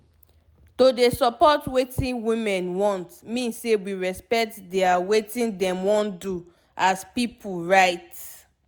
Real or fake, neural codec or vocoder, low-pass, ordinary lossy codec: real; none; none; none